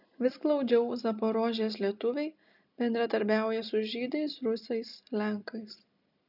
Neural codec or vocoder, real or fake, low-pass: none; real; 5.4 kHz